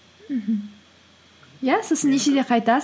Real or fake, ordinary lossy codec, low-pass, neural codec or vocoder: real; none; none; none